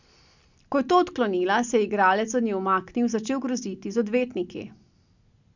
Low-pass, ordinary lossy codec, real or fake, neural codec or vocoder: 7.2 kHz; none; real; none